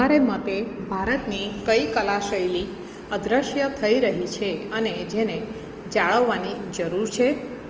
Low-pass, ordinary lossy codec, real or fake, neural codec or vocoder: 7.2 kHz; Opus, 24 kbps; real; none